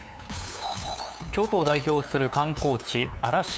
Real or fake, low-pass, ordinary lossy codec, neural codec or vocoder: fake; none; none; codec, 16 kHz, 2 kbps, FunCodec, trained on LibriTTS, 25 frames a second